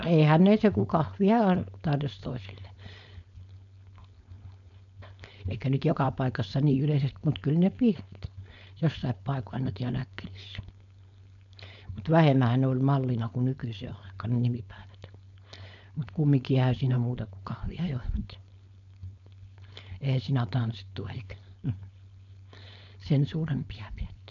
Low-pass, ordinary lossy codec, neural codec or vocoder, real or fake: 7.2 kHz; none; codec, 16 kHz, 4.8 kbps, FACodec; fake